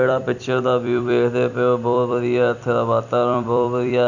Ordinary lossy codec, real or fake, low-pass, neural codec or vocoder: none; fake; 7.2 kHz; vocoder, 44.1 kHz, 128 mel bands every 256 samples, BigVGAN v2